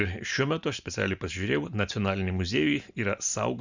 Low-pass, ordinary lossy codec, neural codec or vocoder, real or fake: 7.2 kHz; Opus, 64 kbps; none; real